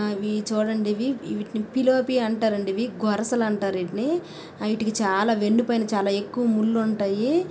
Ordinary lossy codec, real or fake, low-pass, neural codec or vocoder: none; real; none; none